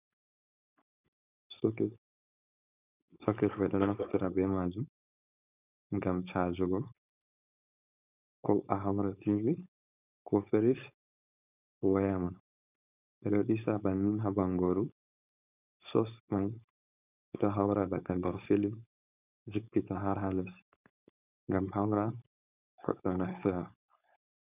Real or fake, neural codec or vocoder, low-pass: fake; codec, 16 kHz, 4.8 kbps, FACodec; 3.6 kHz